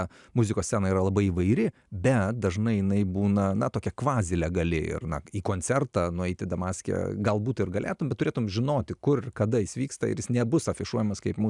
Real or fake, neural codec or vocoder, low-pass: real; none; 10.8 kHz